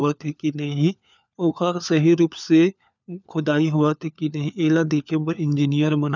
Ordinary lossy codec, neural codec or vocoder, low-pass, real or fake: none; codec, 16 kHz, 4 kbps, FunCodec, trained on LibriTTS, 50 frames a second; 7.2 kHz; fake